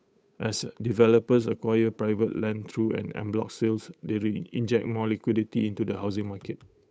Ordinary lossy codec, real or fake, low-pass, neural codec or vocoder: none; fake; none; codec, 16 kHz, 8 kbps, FunCodec, trained on Chinese and English, 25 frames a second